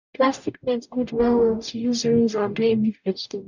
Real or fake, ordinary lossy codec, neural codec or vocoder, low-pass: fake; none; codec, 44.1 kHz, 0.9 kbps, DAC; 7.2 kHz